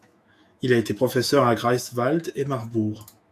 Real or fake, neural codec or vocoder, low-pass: fake; autoencoder, 48 kHz, 128 numbers a frame, DAC-VAE, trained on Japanese speech; 14.4 kHz